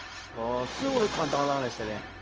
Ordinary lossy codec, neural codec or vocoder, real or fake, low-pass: Opus, 24 kbps; codec, 16 kHz, 0.4 kbps, LongCat-Audio-Codec; fake; 7.2 kHz